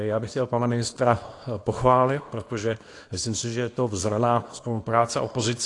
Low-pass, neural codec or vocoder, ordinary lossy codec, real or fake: 10.8 kHz; codec, 24 kHz, 0.9 kbps, WavTokenizer, small release; AAC, 48 kbps; fake